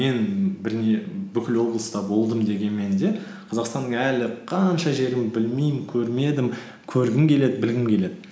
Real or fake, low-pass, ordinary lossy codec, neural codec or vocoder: real; none; none; none